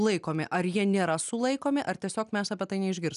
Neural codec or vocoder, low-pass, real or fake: none; 10.8 kHz; real